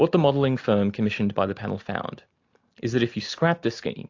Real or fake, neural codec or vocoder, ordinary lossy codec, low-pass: real; none; AAC, 48 kbps; 7.2 kHz